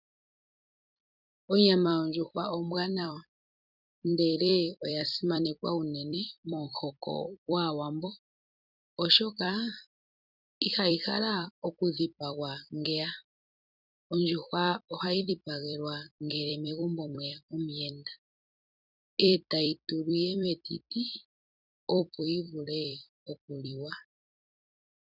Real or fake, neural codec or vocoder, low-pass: fake; vocoder, 44.1 kHz, 128 mel bands every 256 samples, BigVGAN v2; 5.4 kHz